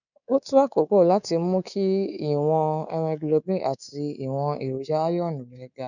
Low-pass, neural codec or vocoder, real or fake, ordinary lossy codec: 7.2 kHz; codec, 24 kHz, 3.1 kbps, DualCodec; fake; none